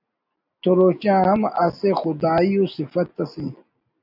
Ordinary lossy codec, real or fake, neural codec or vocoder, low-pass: AAC, 48 kbps; real; none; 5.4 kHz